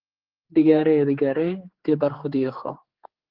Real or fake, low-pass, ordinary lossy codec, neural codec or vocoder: fake; 5.4 kHz; Opus, 16 kbps; codec, 16 kHz, 4 kbps, X-Codec, HuBERT features, trained on general audio